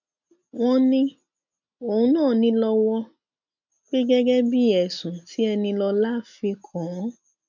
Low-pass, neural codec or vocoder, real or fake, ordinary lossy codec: 7.2 kHz; none; real; none